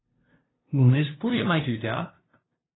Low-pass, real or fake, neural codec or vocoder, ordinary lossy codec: 7.2 kHz; fake; codec, 16 kHz, 0.5 kbps, FunCodec, trained on LibriTTS, 25 frames a second; AAC, 16 kbps